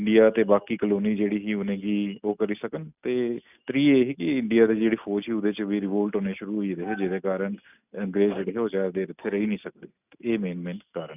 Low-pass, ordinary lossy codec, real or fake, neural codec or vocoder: 3.6 kHz; none; real; none